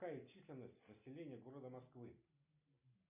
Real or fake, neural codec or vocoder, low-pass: real; none; 3.6 kHz